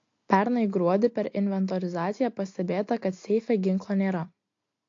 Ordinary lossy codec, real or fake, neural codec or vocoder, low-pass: AAC, 48 kbps; real; none; 7.2 kHz